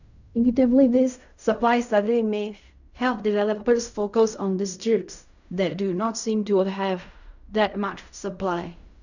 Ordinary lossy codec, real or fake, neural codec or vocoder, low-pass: none; fake; codec, 16 kHz in and 24 kHz out, 0.4 kbps, LongCat-Audio-Codec, fine tuned four codebook decoder; 7.2 kHz